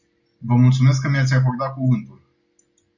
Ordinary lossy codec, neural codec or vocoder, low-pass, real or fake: Opus, 64 kbps; none; 7.2 kHz; real